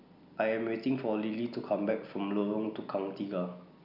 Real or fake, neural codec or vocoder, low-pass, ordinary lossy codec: real; none; 5.4 kHz; none